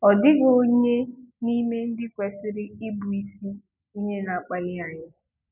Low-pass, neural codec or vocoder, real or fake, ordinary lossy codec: 3.6 kHz; none; real; none